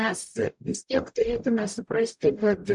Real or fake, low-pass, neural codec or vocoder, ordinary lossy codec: fake; 10.8 kHz; codec, 44.1 kHz, 0.9 kbps, DAC; MP3, 96 kbps